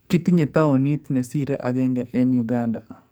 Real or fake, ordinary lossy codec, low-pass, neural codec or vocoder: fake; none; none; codec, 44.1 kHz, 2.6 kbps, SNAC